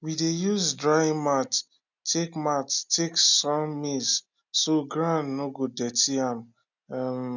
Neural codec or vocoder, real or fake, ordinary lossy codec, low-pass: none; real; none; 7.2 kHz